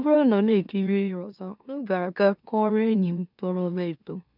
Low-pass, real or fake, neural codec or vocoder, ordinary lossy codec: 5.4 kHz; fake; autoencoder, 44.1 kHz, a latent of 192 numbers a frame, MeloTTS; none